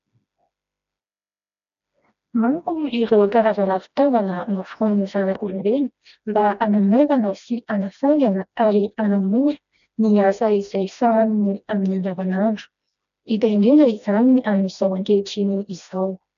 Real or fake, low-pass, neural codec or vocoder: fake; 7.2 kHz; codec, 16 kHz, 1 kbps, FreqCodec, smaller model